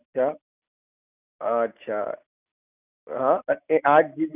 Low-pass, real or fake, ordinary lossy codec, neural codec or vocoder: 3.6 kHz; real; none; none